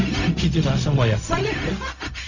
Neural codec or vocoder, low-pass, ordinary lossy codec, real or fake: codec, 16 kHz, 0.4 kbps, LongCat-Audio-Codec; 7.2 kHz; none; fake